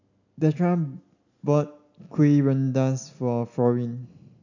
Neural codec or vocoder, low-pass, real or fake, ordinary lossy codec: none; 7.2 kHz; real; none